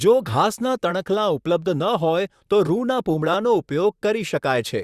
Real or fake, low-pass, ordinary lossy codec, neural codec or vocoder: fake; 14.4 kHz; Opus, 64 kbps; vocoder, 44.1 kHz, 128 mel bands, Pupu-Vocoder